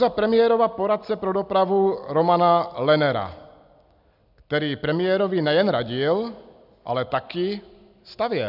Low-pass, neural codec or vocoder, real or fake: 5.4 kHz; none; real